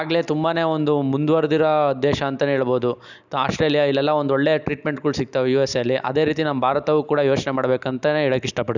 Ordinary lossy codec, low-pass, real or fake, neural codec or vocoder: none; 7.2 kHz; real; none